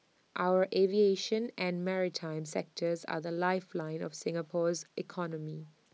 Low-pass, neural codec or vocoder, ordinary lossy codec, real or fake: none; none; none; real